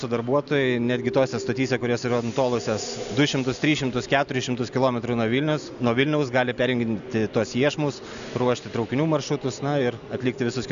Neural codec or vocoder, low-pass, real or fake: none; 7.2 kHz; real